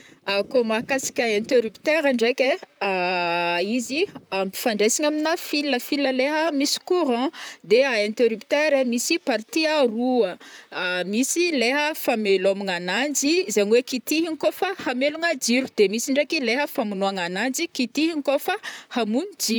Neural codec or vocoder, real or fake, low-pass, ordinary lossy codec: vocoder, 44.1 kHz, 128 mel bands, Pupu-Vocoder; fake; none; none